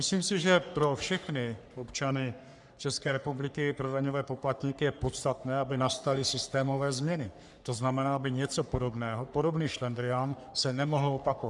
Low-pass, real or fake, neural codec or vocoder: 10.8 kHz; fake; codec, 44.1 kHz, 3.4 kbps, Pupu-Codec